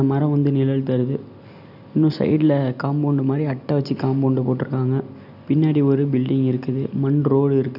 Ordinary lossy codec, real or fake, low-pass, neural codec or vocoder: none; real; 5.4 kHz; none